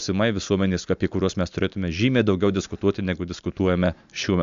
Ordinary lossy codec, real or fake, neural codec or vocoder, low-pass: MP3, 64 kbps; real; none; 7.2 kHz